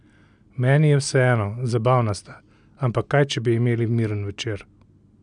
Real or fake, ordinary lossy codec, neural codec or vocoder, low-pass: real; MP3, 96 kbps; none; 9.9 kHz